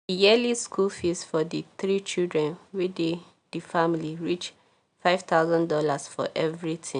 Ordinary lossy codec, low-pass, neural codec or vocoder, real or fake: none; 9.9 kHz; none; real